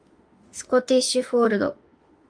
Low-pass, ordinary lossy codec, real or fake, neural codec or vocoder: 9.9 kHz; Opus, 32 kbps; fake; autoencoder, 48 kHz, 32 numbers a frame, DAC-VAE, trained on Japanese speech